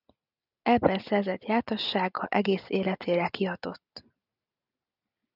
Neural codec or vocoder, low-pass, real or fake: none; 5.4 kHz; real